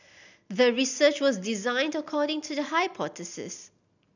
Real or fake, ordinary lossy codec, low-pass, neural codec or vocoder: real; none; 7.2 kHz; none